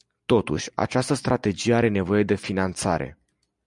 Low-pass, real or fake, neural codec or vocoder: 10.8 kHz; real; none